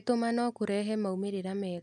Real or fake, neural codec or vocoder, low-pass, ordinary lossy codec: real; none; 10.8 kHz; none